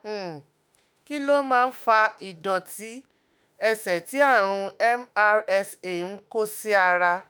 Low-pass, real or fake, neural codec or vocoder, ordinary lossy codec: none; fake; autoencoder, 48 kHz, 32 numbers a frame, DAC-VAE, trained on Japanese speech; none